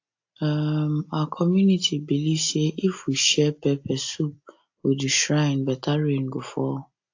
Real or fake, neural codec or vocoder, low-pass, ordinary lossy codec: real; none; 7.2 kHz; AAC, 48 kbps